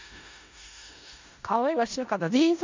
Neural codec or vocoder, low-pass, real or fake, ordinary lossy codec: codec, 16 kHz in and 24 kHz out, 0.4 kbps, LongCat-Audio-Codec, four codebook decoder; 7.2 kHz; fake; MP3, 64 kbps